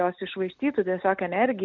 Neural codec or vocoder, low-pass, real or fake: none; 7.2 kHz; real